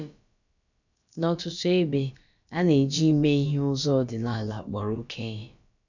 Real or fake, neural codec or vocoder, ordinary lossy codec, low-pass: fake; codec, 16 kHz, about 1 kbps, DyCAST, with the encoder's durations; none; 7.2 kHz